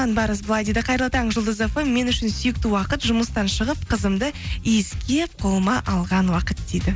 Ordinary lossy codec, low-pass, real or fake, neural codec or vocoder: none; none; real; none